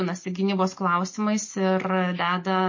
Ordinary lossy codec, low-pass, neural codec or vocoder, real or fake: MP3, 32 kbps; 7.2 kHz; none; real